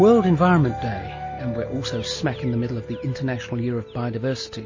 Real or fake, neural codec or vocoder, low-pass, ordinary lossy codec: real; none; 7.2 kHz; MP3, 32 kbps